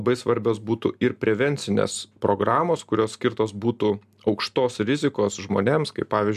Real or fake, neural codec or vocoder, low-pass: real; none; 14.4 kHz